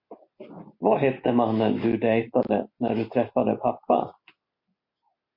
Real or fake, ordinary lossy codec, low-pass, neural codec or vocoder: real; MP3, 32 kbps; 5.4 kHz; none